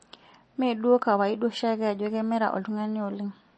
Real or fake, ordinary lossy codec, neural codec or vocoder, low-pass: real; MP3, 32 kbps; none; 10.8 kHz